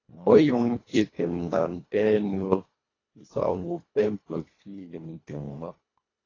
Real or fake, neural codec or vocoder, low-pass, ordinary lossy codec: fake; codec, 24 kHz, 1.5 kbps, HILCodec; 7.2 kHz; AAC, 32 kbps